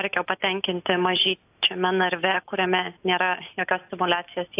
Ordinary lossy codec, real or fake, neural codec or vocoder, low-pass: AAC, 32 kbps; real; none; 3.6 kHz